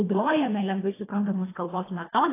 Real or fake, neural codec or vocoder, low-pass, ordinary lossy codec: fake; codec, 24 kHz, 1.5 kbps, HILCodec; 3.6 kHz; AAC, 16 kbps